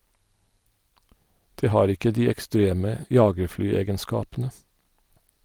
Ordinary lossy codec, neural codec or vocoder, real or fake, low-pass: Opus, 24 kbps; none; real; 19.8 kHz